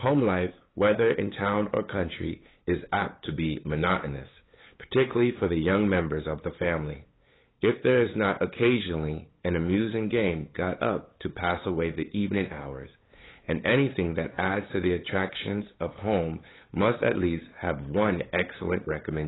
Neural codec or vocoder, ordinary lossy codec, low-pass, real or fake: codec, 16 kHz, 8 kbps, FunCodec, trained on LibriTTS, 25 frames a second; AAC, 16 kbps; 7.2 kHz; fake